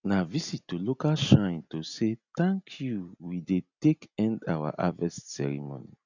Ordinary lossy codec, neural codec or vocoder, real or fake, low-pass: none; none; real; 7.2 kHz